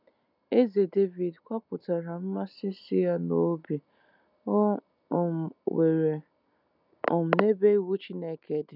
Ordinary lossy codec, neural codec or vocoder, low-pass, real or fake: none; none; 5.4 kHz; real